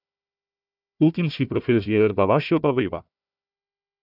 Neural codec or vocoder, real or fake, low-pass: codec, 16 kHz, 1 kbps, FunCodec, trained on Chinese and English, 50 frames a second; fake; 5.4 kHz